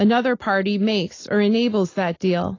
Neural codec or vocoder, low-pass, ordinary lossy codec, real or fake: none; 7.2 kHz; AAC, 32 kbps; real